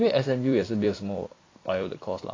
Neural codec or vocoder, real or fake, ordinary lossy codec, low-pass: vocoder, 44.1 kHz, 128 mel bands every 256 samples, BigVGAN v2; fake; AAC, 32 kbps; 7.2 kHz